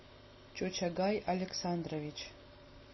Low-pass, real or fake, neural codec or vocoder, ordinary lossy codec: 7.2 kHz; real; none; MP3, 24 kbps